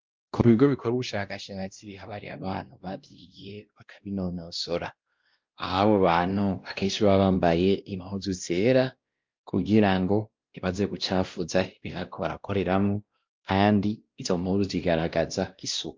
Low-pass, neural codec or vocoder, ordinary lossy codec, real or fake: 7.2 kHz; codec, 16 kHz, 1 kbps, X-Codec, WavLM features, trained on Multilingual LibriSpeech; Opus, 24 kbps; fake